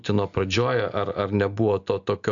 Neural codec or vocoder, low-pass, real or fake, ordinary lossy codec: none; 7.2 kHz; real; AAC, 64 kbps